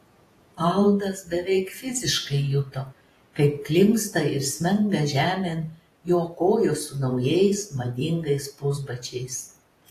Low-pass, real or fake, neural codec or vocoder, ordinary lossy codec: 14.4 kHz; fake; vocoder, 48 kHz, 128 mel bands, Vocos; AAC, 48 kbps